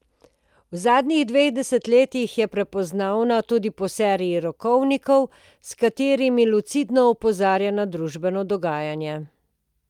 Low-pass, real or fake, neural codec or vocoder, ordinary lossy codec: 19.8 kHz; real; none; Opus, 32 kbps